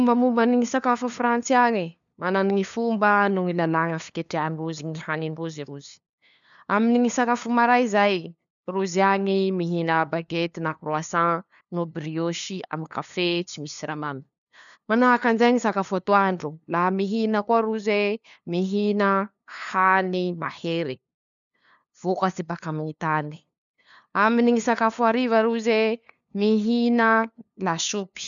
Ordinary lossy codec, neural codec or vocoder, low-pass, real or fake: none; codec, 16 kHz, 2 kbps, FunCodec, trained on LibriTTS, 25 frames a second; 7.2 kHz; fake